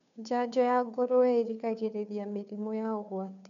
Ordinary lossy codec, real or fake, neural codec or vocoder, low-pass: none; fake; codec, 16 kHz, 2 kbps, FunCodec, trained on Chinese and English, 25 frames a second; 7.2 kHz